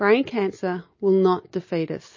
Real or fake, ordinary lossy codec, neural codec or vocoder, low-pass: real; MP3, 32 kbps; none; 7.2 kHz